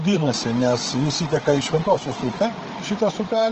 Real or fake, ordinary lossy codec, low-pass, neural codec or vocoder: fake; Opus, 16 kbps; 7.2 kHz; codec, 16 kHz, 8 kbps, FreqCodec, larger model